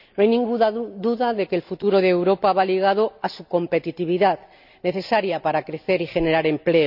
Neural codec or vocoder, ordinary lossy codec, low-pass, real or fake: none; none; 5.4 kHz; real